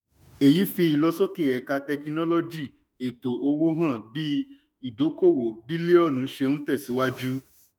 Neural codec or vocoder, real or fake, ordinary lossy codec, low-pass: autoencoder, 48 kHz, 32 numbers a frame, DAC-VAE, trained on Japanese speech; fake; none; none